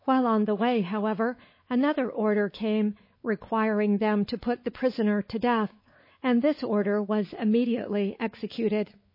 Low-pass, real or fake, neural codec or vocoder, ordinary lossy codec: 5.4 kHz; fake; codec, 16 kHz, 4 kbps, X-Codec, WavLM features, trained on Multilingual LibriSpeech; MP3, 24 kbps